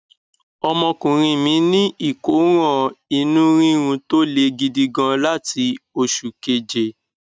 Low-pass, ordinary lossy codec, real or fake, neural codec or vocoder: none; none; real; none